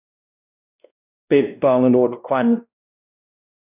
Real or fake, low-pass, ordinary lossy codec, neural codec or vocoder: fake; 3.6 kHz; none; codec, 16 kHz, 1 kbps, X-Codec, WavLM features, trained on Multilingual LibriSpeech